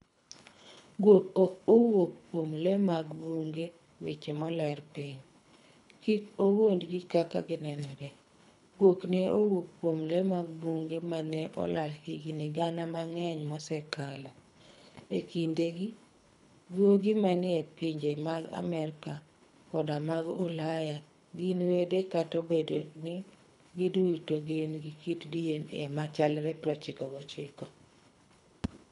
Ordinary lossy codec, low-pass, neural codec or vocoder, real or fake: MP3, 96 kbps; 10.8 kHz; codec, 24 kHz, 3 kbps, HILCodec; fake